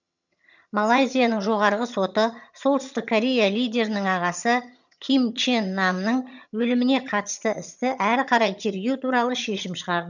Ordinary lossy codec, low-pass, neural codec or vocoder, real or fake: none; 7.2 kHz; vocoder, 22.05 kHz, 80 mel bands, HiFi-GAN; fake